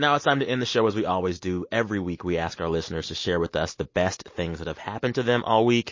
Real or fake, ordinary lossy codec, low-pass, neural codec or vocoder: real; MP3, 32 kbps; 7.2 kHz; none